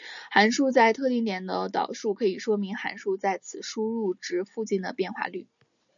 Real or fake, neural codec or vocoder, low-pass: real; none; 7.2 kHz